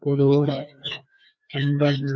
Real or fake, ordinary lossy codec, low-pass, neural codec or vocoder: fake; none; none; codec, 16 kHz, 2 kbps, FreqCodec, larger model